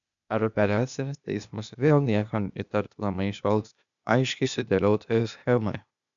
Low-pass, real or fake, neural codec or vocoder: 7.2 kHz; fake; codec, 16 kHz, 0.8 kbps, ZipCodec